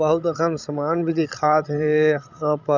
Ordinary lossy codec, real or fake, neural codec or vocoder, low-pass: none; fake; codec, 16 kHz, 16 kbps, FreqCodec, larger model; 7.2 kHz